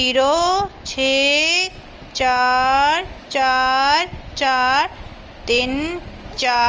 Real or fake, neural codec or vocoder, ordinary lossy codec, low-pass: real; none; Opus, 24 kbps; 7.2 kHz